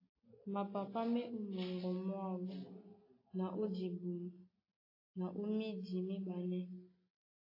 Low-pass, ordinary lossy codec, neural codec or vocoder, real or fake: 5.4 kHz; AAC, 24 kbps; none; real